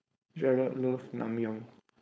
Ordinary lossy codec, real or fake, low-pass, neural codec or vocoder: none; fake; none; codec, 16 kHz, 4.8 kbps, FACodec